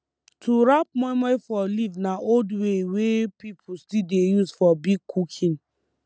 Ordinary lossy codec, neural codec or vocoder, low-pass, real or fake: none; none; none; real